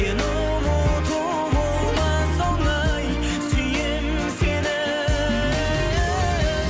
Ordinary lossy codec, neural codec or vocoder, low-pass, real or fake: none; none; none; real